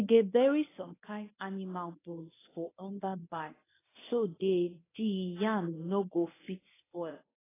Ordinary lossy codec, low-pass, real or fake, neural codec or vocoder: AAC, 16 kbps; 3.6 kHz; fake; codec, 24 kHz, 0.9 kbps, WavTokenizer, medium speech release version 1